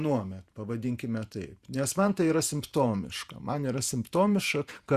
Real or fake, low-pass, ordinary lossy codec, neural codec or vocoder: fake; 14.4 kHz; Opus, 64 kbps; vocoder, 48 kHz, 128 mel bands, Vocos